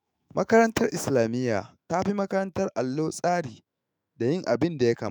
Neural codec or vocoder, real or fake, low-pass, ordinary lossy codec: autoencoder, 48 kHz, 128 numbers a frame, DAC-VAE, trained on Japanese speech; fake; none; none